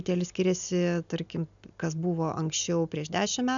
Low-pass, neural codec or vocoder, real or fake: 7.2 kHz; none; real